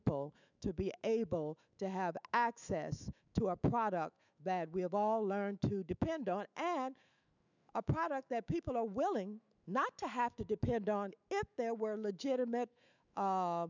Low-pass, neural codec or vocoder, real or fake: 7.2 kHz; autoencoder, 48 kHz, 128 numbers a frame, DAC-VAE, trained on Japanese speech; fake